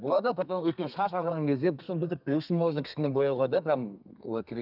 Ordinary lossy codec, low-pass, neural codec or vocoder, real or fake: none; 5.4 kHz; codec, 32 kHz, 1.9 kbps, SNAC; fake